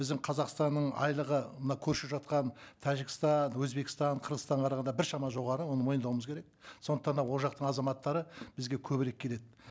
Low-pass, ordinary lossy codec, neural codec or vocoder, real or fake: none; none; none; real